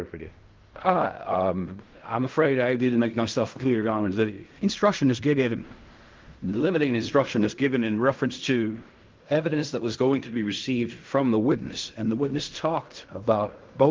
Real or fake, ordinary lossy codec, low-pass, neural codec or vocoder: fake; Opus, 24 kbps; 7.2 kHz; codec, 16 kHz in and 24 kHz out, 0.4 kbps, LongCat-Audio-Codec, fine tuned four codebook decoder